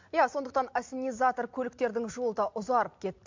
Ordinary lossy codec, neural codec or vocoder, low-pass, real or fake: MP3, 48 kbps; none; 7.2 kHz; real